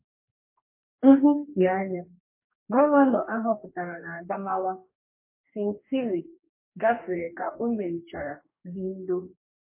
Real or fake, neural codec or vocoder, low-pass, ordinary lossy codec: fake; codec, 44.1 kHz, 2.6 kbps, DAC; 3.6 kHz; MP3, 24 kbps